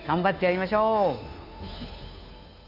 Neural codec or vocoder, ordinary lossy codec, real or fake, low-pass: codec, 16 kHz, 2 kbps, FunCodec, trained on Chinese and English, 25 frames a second; none; fake; 5.4 kHz